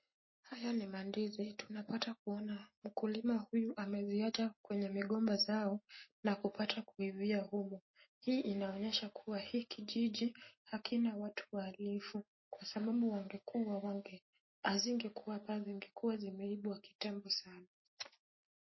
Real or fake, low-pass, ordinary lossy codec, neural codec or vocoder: real; 7.2 kHz; MP3, 24 kbps; none